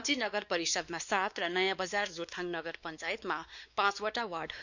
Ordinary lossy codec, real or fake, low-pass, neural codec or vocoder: none; fake; 7.2 kHz; codec, 16 kHz, 2 kbps, X-Codec, WavLM features, trained on Multilingual LibriSpeech